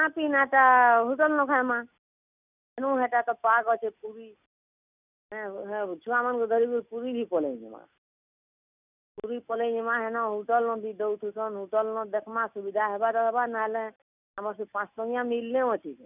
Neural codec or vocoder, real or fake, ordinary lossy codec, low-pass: none; real; none; 3.6 kHz